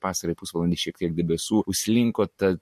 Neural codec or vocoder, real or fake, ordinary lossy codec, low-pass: none; real; MP3, 64 kbps; 14.4 kHz